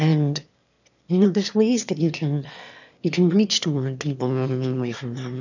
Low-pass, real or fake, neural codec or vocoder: 7.2 kHz; fake; autoencoder, 22.05 kHz, a latent of 192 numbers a frame, VITS, trained on one speaker